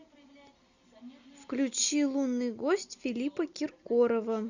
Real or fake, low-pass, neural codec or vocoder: real; 7.2 kHz; none